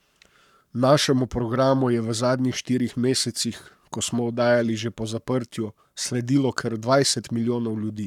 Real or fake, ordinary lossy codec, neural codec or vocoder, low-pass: fake; none; codec, 44.1 kHz, 7.8 kbps, Pupu-Codec; 19.8 kHz